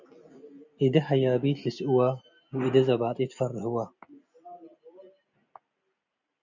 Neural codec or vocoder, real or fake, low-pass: none; real; 7.2 kHz